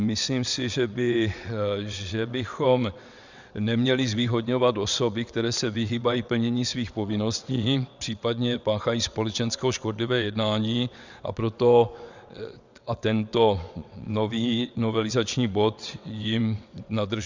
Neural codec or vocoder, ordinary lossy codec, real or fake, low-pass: vocoder, 22.05 kHz, 80 mel bands, WaveNeXt; Opus, 64 kbps; fake; 7.2 kHz